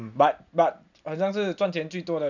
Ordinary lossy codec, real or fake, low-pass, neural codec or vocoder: none; real; 7.2 kHz; none